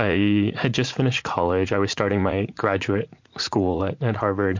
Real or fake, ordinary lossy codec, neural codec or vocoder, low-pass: fake; MP3, 48 kbps; vocoder, 44.1 kHz, 80 mel bands, Vocos; 7.2 kHz